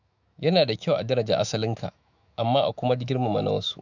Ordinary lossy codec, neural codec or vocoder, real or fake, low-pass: none; autoencoder, 48 kHz, 128 numbers a frame, DAC-VAE, trained on Japanese speech; fake; 7.2 kHz